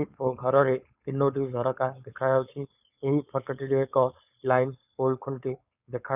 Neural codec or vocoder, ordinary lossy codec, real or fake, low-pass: codec, 16 kHz, 4.8 kbps, FACodec; none; fake; 3.6 kHz